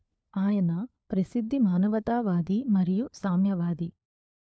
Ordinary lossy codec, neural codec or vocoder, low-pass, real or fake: none; codec, 16 kHz, 4 kbps, FunCodec, trained on LibriTTS, 50 frames a second; none; fake